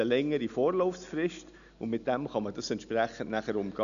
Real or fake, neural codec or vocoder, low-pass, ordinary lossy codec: real; none; 7.2 kHz; none